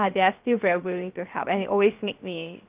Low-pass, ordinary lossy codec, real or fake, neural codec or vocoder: 3.6 kHz; Opus, 32 kbps; fake; codec, 16 kHz, 0.7 kbps, FocalCodec